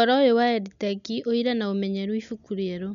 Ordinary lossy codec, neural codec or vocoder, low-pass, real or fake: none; none; 7.2 kHz; real